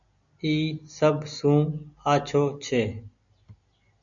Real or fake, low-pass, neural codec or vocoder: real; 7.2 kHz; none